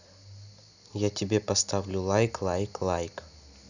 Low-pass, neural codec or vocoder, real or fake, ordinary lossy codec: 7.2 kHz; none; real; none